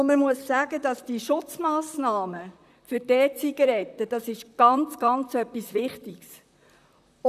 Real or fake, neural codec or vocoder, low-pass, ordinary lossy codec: fake; vocoder, 44.1 kHz, 128 mel bands, Pupu-Vocoder; 14.4 kHz; none